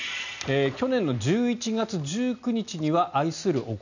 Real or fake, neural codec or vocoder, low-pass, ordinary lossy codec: real; none; 7.2 kHz; none